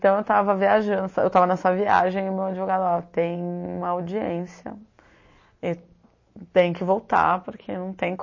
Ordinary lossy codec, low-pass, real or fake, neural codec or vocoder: MP3, 32 kbps; 7.2 kHz; real; none